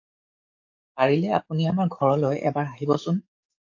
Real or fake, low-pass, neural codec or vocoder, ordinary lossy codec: fake; 7.2 kHz; codec, 44.1 kHz, 7.8 kbps, DAC; AAC, 48 kbps